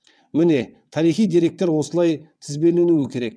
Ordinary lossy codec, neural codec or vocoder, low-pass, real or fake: none; vocoder, 22.05 kHz, 80 mel bands, WaveNeXt; none; fake